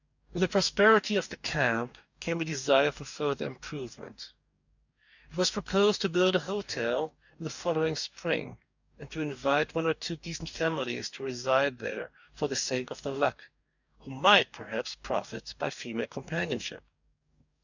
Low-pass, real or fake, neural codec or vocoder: 7.2 kHz; fake; codec, 44.1 kHz, 2.6 kbps, DAC